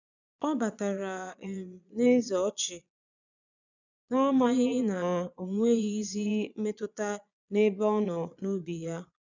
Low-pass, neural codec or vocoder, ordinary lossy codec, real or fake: 7.2 kHz; vocoder, 22.05 kHz, 80 mel bands, Vocos; none; fake